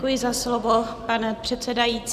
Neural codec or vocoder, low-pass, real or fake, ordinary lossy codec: none; 14.4 kHz; real; Opus, 64 kbps